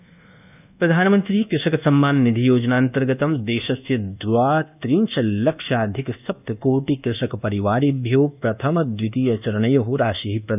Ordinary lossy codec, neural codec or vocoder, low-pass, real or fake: none; codec, 24 kHz, 1.2 kbps, DualCodec; 3.6 kHz; fake